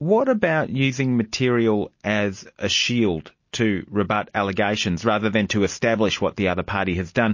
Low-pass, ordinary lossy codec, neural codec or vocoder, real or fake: 7.2 kHz; MP3, 32 kbps; none; real